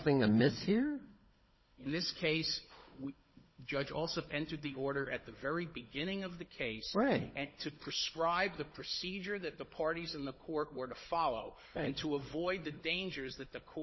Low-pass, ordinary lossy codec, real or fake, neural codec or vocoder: 7.2 kHz; MP3, 24 kbps; fake; codec, 16 kHz, 16 kbps, FunCodec, trained on Chinese and English, 50 frames a second